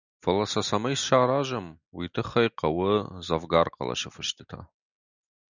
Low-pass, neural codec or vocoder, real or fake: 7.2 kHz; none; real